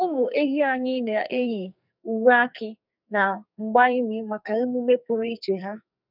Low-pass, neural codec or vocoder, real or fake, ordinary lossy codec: 5.4 kHz; codec, 44.1 kHz, 2.6 kbps, SNAC; fake; none